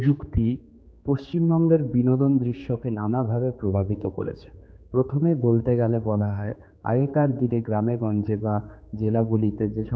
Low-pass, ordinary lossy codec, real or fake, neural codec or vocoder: none; none; fake; codec, 16 kHz, 4 kbps, X-Codec, HuBERT features, trained on general audio